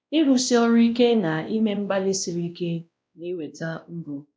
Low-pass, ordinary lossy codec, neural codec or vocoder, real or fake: none; none; codec, 16 kHz, 1 kbps, X-Codec, WavLM features, trained on Multilingual LibriSpeech; fake